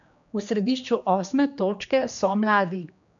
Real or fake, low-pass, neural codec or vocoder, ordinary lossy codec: fake; 7.2 kHz; codec, 16 kHz, 2 kbps, X-Codec, HuBERT features, trained on general audio; none